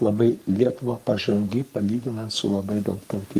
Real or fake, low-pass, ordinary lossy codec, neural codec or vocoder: fake; 14.4 kHz; Opus, 32 kbps; codec, 44.1 kHz, 3.4 kbps, Pupu-Codec